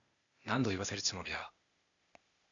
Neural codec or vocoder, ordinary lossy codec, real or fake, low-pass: codec, 16 kHz, 0.8 kbps, ZipCodec; AAC, 64 kbps; fake; 7.2 kHz